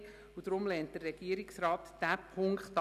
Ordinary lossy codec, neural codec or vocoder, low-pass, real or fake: none; none; 14.4 kHz; real